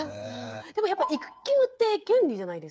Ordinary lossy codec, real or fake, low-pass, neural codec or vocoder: none; fake; none; codec, 16 kHz, 8 kbps, FreqCodec, smaller model